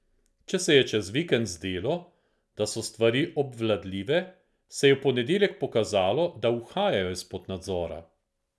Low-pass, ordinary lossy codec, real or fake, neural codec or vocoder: none; none; real; none